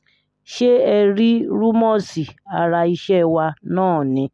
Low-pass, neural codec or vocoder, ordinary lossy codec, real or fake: none; none; none; real